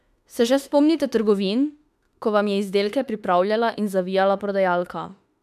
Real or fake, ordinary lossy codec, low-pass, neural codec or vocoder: fake; none; 14.4 kHz; autoencoder, 48 kHz, 32 numbers a frame, DAC-VAE, trained on Japanese speech